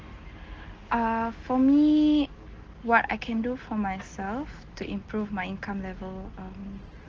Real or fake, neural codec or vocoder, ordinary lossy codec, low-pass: real; none; Opus, 16 kbps; 7.2 kHz